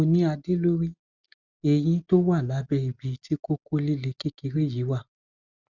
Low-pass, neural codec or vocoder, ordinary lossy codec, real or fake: 7.2 kHz; none; Opus, 64 kbps; real